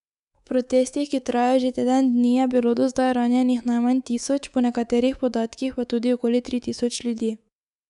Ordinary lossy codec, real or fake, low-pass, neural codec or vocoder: none; fake; 10.8 kHz; codec, 24 kHz, 3.1 kbps, DualCodec